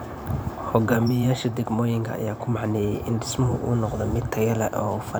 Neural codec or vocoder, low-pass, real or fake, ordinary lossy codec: vocoder, 44.1 kHz, 128 mel bands every 512 samples, BigVGAN v2; none; fake; none